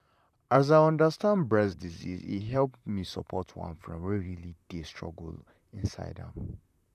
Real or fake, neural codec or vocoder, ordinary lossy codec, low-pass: fake; vocoder, 44.1 kHz, 128 mel bands, Pupu-Vocoder; none; 14.4 kHz